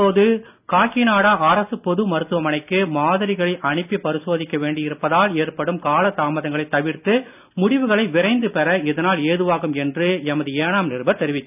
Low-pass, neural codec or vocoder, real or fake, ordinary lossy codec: 3.6 kHz; none; real; none